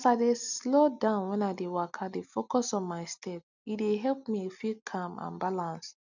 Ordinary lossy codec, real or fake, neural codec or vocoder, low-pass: none; real; none; 7.2 kHz